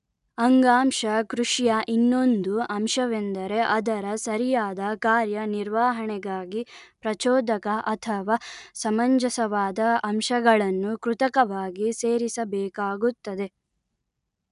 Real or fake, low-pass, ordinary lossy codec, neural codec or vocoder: real; 10.8 kHz; none; none